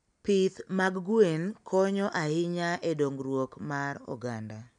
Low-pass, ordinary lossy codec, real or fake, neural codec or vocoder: 9.9 kHz; none; real; none